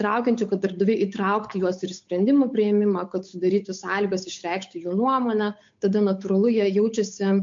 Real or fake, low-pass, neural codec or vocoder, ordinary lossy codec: fake; 7.2 kHz; codec, 16 kHz, 8 kbps, FunCodec, trained on Chinese and English, 25 frames a second; MP3, 48 kbps